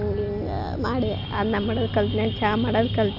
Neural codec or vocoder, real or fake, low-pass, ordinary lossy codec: none; real; 5.4 kHz; none